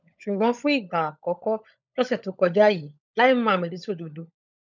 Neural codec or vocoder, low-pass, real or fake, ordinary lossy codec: codec, 16 kHz, 16 kbps, FunCodec, trained on LibriTTS, 50 frames a second; 7.2 kHz; fake; none